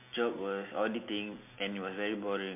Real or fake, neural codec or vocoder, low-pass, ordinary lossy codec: real; none; 3.6 kHz; MP3, 32 kbps